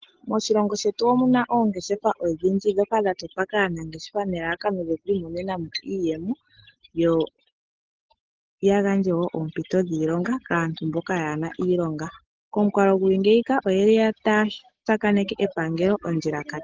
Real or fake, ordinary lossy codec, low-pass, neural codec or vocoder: real; Opus, 16 kbps; 7.2 kHz; none